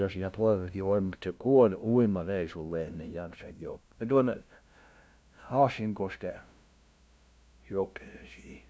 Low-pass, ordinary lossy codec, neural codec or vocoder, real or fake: none; none; codec, 16 kHz, 0.5 kbps, FunCodec, trained on LibriTTS, 25 frames a second; fake